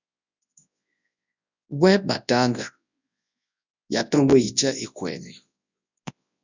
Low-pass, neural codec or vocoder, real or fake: 7.2 kHz; codec, 24 kHz, 0.9 kbps, WavTokenizer, large speech release; fake